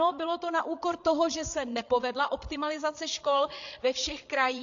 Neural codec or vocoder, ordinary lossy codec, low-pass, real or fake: codec, 16 kHz, 8 kbps, FreqCodec, larger model; AAC, 48 kbps; 7.2 kHz; fake